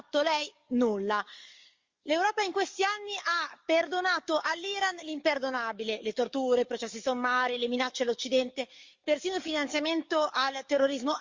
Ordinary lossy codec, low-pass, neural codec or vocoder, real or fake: Opus, 32 kbps; 7.2 kHz; codec, 44.1 kHz, 7.8 kbps, DAC; fake